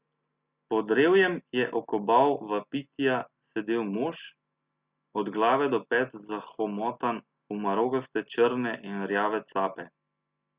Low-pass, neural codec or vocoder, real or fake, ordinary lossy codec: 3.6 kHz; none; real; Opus, 64 kbps